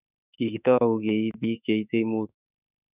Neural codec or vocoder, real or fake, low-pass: autoencoder, 48 kHz, 32 numbers a frame, DAC-VAE, trained on Japanese speech; fake; 3.6 kHz